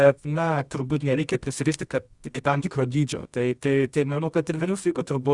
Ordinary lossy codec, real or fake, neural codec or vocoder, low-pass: Opus, 64 kbps; fake; codec, 24 kHz, 0.9 kbps, WavTokenizer, medium music audio release; 10.8 kHz